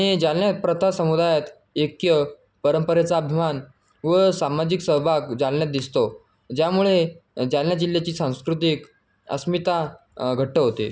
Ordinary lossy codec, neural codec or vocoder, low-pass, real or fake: none; none; none; real